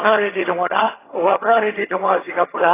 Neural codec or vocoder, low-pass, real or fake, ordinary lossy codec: vocoder, 22.05 kHz, 80 mel bands, HiFi-GAN; 3.6 kHz; fake; AAC, 16 kbps